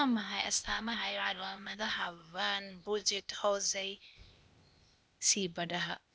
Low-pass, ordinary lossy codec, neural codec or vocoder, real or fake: none; none; codec, 16 kHz, 0.8 kbps, ZipCodec; fake